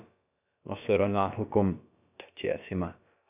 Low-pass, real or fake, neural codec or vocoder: 3.6 kHz; fake; codec, 16 kHz, about 1 kbps, DyCAST, with the encoder's durations